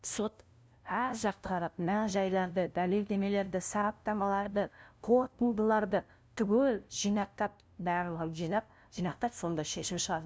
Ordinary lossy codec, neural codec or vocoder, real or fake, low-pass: none; codec, 16 kHz, 0.5 kbps, FunCodec, trained on LibriTTS, 25 frames a second; fake; none